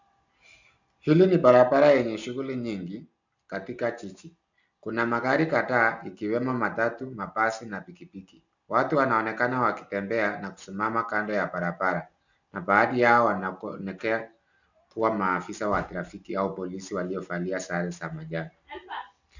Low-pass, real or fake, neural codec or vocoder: 7.2 kHz; real; none